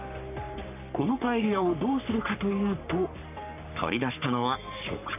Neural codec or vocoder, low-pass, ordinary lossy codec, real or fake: codec, 44.1 kHz, 3.4 kbps, Pupu-Codec; 3.6 kHz; none; fake